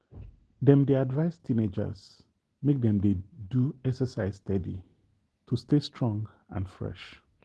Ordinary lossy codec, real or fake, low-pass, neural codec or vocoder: Opus, 16 kbps; real; 10.8 kHz; none